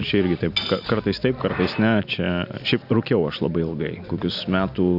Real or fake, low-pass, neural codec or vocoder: real; 5.4 kHz; none